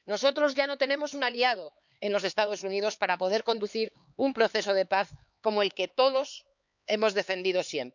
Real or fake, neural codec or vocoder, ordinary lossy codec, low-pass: fake; codec, 16 kHz, 4 kbps, X-Codec, HuBERT features, trained on LibriSpeech; none; 7.2 kHz